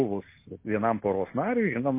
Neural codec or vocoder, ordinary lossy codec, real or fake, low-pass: none; MP3, 24 kbps; real; 3.6 kHz